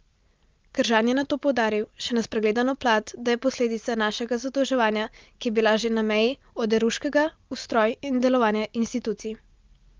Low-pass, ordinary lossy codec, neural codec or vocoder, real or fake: 7.2 kHz; Opus, 24 kbps; none; real